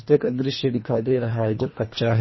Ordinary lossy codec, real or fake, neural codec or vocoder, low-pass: MP3, 24 kbps; fake; codec, 24 kHz, 1.5 kbps, HILCodec; 7.2 kHz